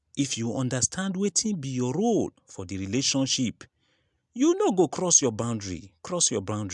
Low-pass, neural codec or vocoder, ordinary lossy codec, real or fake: 10.8 kHz; none; MP3, 96 kbps; real